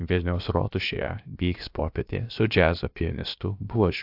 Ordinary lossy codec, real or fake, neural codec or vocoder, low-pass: AAC, 48 kbps; fake; codec, 16 kHz, about 1 kbps, DyCAST, with the encoder's durations; 5.4 kHz